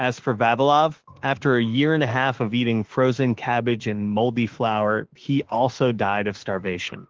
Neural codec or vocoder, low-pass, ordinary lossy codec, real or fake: autoencoder, 48 kHz, 32 numbers a frame, DAC-VAE, trained on Japanese speech; 7.2 kHz; Opus, 16 kbps; fake